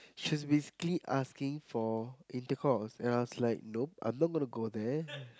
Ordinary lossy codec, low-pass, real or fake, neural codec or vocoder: none; none; real; none